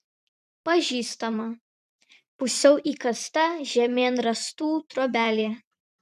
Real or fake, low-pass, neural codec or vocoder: fake; 14.4 kHz; vocoder, 44.1 kHz, 128 mel bands every 512 samples, BigVGAN v2